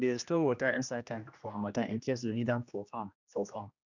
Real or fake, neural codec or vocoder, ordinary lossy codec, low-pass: fake; codec, 16 kHz, 1 kbps, X-Codec, HuBERT features, trained on general audio; none; 7.2 kHz